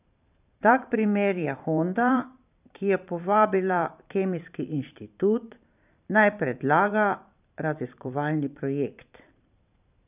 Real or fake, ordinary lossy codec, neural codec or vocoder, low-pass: fake; none; vocoder, 44.1 kHz, 128 mel bands every 512 samples, BigVGAN v2; 3.6 kHz